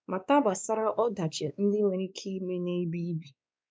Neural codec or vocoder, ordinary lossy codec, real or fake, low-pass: codec, 16 kHz, 2 kbps, X-Codec, WavLM features, trained on Multilingual LibriSpeech; none; fake; none